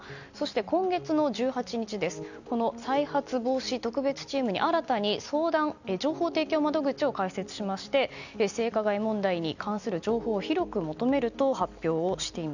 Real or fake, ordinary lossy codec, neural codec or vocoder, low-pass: real; none; none; 7.2 kHz